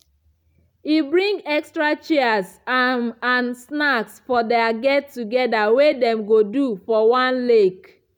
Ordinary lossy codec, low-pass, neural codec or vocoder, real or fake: none; 19.8 kHz; none; real